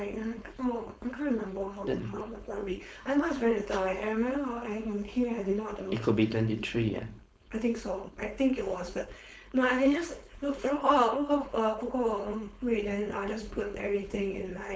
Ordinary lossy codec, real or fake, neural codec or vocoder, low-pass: none; fake; codec, 16 kHz, 4.8 kbps, FACodec; none